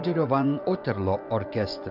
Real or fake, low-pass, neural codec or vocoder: fake; 5.4 kHz; vocoder, 24 kHz, 100 mel bands, Vocos